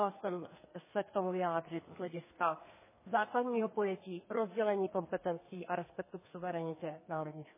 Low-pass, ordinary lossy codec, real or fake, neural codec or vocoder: 3.6 kHz; MP3, 16 kbps; fake; codec, 32 kHz, 1.9 kbps, SNAC